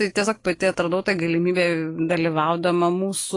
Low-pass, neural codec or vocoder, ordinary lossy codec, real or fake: 10.8 kHz; autoencoder, 48 kHz, 128 numbers a frame, DAC-VAE, trained on Japanese speech; AAC, 32 kbps; fake